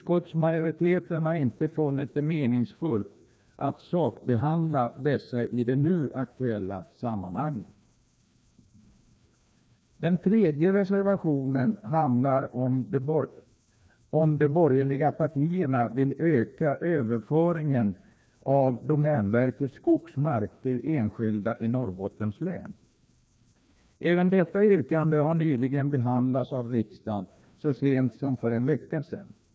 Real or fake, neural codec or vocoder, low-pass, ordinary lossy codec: fake; codec, 16 kHz, 1 kbps, FreqCodec, larger model; none; none